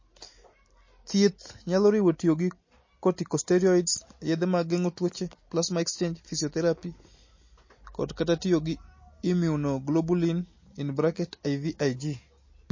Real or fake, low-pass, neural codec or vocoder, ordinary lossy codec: real; 7.2 kHz; none; MP3, 32 kbps